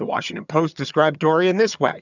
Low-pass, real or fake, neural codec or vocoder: 7.2 kHz; fake; vocoder, 22.05 kHz, 80 mel bands, HiFi-GAN